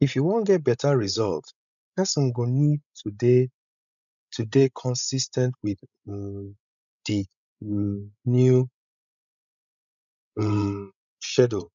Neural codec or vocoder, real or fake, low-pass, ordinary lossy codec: codec, 16 kHz, 16 kbps, FreqCodec, larger model; fake; 7.2 kHz; none